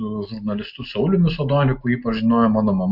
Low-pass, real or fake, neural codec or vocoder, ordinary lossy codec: 5.4 kHz; real; none; MP3, 48 kbps